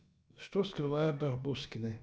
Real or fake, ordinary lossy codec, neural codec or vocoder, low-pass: fake; none; codec, 16 kHz, about 1 kbps, DyCAST, with the encoder's durations; none